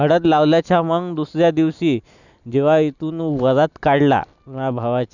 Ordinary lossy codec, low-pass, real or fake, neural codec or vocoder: none; 7.2 kHz; real; none